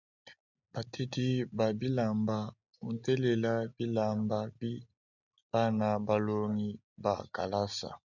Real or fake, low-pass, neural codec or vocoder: real; 7.2 kHz; none